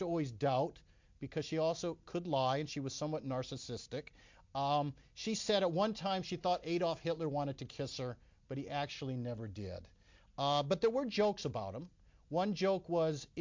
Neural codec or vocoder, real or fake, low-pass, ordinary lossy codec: none; real; 7.2 kHz; MP3, 48 kbps